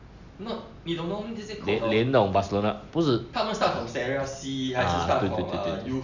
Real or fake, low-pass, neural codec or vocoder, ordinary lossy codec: real; 7.2 kHz; none; none